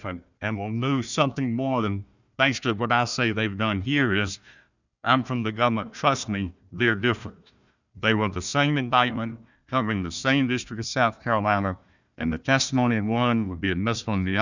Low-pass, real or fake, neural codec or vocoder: 7.2 kHz; fake; codec, 16 kHz, 1 kbps, FunCodec, trained on Chinese and English, 50 frames a second